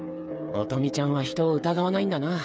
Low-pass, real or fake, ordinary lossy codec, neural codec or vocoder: none; fake; none; codec, 16 kHz, 8 kbps, FreqCodec, smaller model